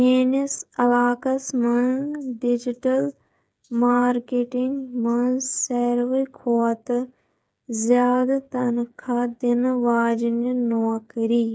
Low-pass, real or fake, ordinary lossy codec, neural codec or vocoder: none; fake; none; codec, 16 kHz, 8 kbps, FreqCodec, smaller model